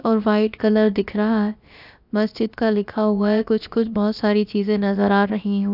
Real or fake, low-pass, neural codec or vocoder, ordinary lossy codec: fake; 5.4 kHz; codec, 16 kHz, about 1 kbps, DyCAST, with the encoder's durations; none